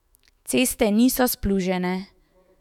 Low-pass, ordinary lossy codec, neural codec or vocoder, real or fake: 19.8 kHz; none; autoencoder, 48 kHz, 128 numbers a frame, DAC-VAE, trained on Japanese speech; fake